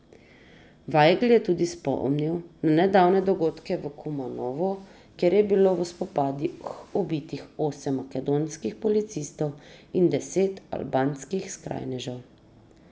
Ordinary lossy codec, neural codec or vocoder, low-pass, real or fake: none; none; none; real